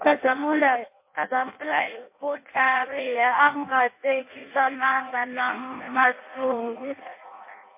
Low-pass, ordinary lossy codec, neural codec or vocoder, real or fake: 3.6 kHz; MP3, 24 kbps; codec, 16 kHz in and 24 kHz out, 0.6 kbps, FireRedTTS-2 codec; fake